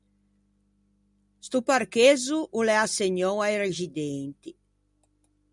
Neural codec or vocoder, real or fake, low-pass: none; real; 10.8 kHz